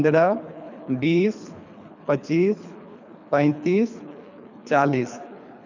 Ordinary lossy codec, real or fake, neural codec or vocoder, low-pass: none; fake; codec, 24 kHz, 3 kbps, HILCodec; 7.2 kHz